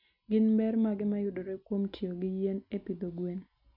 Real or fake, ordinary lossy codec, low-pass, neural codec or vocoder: real; none; 5.4 kHz; none